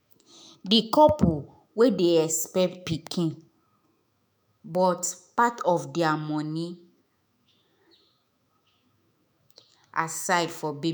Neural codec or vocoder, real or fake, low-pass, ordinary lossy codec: autoencoder, 48 kHz, 128 numbers a frame, DAC-VAE, trained on Japanese speech; fake; none; none